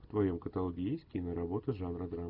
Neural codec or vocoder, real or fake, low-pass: none; real; 5.4 kHz